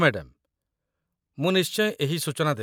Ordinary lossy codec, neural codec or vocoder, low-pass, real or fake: none; none; none; real